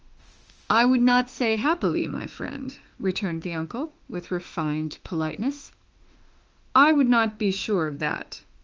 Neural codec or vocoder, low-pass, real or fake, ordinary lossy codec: autoencoder, 48 kHz, 32 numbers a frame, DAC-VAE, trained on Japanese speech; 7.2 kHz; fake; Opus, 24 kbps